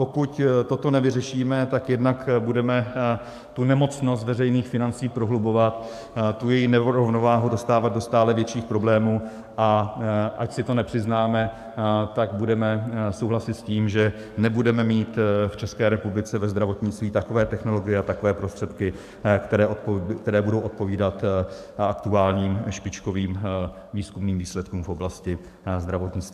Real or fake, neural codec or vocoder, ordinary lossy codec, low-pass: fake; codec, 44.1 kHz, 7.8 kbps, DAC; AAC, 96 kbps; 14.4 kHz